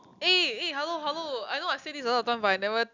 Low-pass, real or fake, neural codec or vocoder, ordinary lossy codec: 7.2 kHz; real; none; none